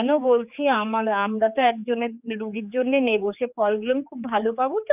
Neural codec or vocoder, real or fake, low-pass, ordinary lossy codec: codec, 16 kHz, 4 kbps, X-Codec, HuBERT features, trained on general audio; fake; 3.6 kHz; none